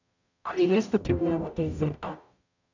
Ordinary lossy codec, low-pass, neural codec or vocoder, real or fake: none; 7.2 kHz; codec, 44.1 kHz, 0.9 kbps, DAC; fake